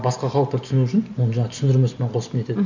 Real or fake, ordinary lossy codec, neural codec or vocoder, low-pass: real; none; none; 7.2 kHz